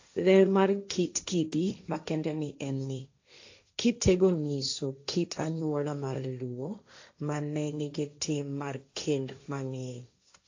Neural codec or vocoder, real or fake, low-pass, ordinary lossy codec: codec, 16 kHz, 1.1 kbps, Voila-Tokenizer; fake; 7.2 kHz; AAC, 48 kbps